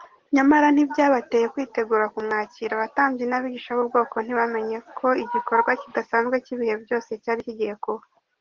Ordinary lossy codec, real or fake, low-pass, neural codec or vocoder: Opus, 16 kbps; real; 7.2 kHz; none